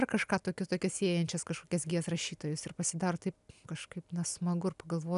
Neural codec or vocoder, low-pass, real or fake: none; 10.8 kHz; real